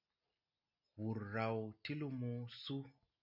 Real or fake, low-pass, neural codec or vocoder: real; 5.4 kHz; none